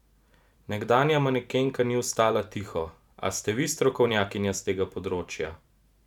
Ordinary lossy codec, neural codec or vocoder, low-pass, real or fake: none; vocoder, 44.1 kHz, 128 mel bands every 512 samples, BigVGAN v2; 19.8 kHz; fake